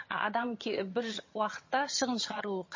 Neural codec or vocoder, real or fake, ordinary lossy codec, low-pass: vocoder, 22.05 kHz, 80 mel bands, HiFi-GAN; fake; MP3, 32 kbps; 7.2 kHz